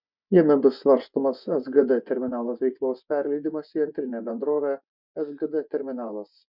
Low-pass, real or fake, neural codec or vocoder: 5.4 kHz; fake; vocoder, 24 kHz, 100 mel bands, Vocos